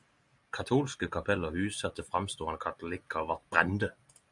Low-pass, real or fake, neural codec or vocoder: 10.8 kHz; real; none